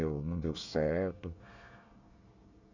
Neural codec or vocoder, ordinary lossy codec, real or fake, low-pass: codec, 24 kHz, 1 kbps, SNAC; none; fake; 7.2 kHz